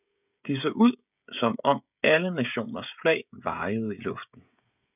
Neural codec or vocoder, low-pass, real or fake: codec, 16 kHz, 16 kbps, FreqCodec, smaller model; 3.6 kHz; fake